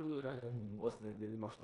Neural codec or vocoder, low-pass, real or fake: codec, 16 kHz in and 24 kHz out, 0.9 kbps, LongCat-Audio-Codec, four codebook decoder; 10.8 kHz; fake